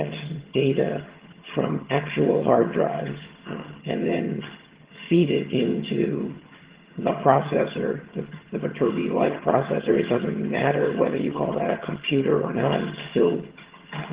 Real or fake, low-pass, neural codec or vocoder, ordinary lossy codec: fake; 3.6 kHz; vocoder, 22.05 kHz, 80 mel bands, HiFi-GAN; Opus, 16 kbps